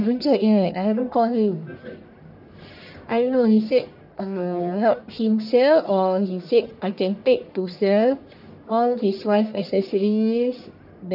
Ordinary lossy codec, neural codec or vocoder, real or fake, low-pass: none; codec, 44.1 kHz, 1.7 kbps, Pupu-Codec; fake; 5.4 kHz